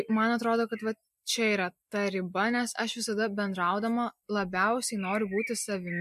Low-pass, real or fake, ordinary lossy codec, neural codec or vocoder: 14.4 kHz; real; MP3, 64 kbps; none